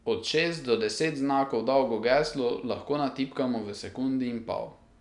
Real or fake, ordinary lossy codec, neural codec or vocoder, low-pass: real; none; none; 10.8 kHz